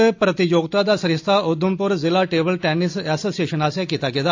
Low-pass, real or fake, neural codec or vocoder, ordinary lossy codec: 7.2 kHz; real; none; AAC, 48 kbps